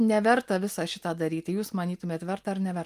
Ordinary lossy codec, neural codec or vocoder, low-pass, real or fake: Opus, 32 kbps; none; 14.4 kHz; real